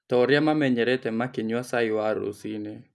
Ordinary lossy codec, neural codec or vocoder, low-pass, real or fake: none; none; none; real